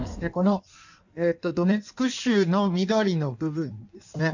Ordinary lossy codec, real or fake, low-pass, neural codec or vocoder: none; fake; 7.2 kHz; codec, 16 kHz in and 24 kHz out, 1.1 kbps, FireRedTTS-2 codec